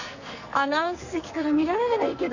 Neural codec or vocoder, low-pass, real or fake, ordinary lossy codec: codec, 44.1 kHz, 2.6 kbps, SNAC; 7.2 kHz; fake; none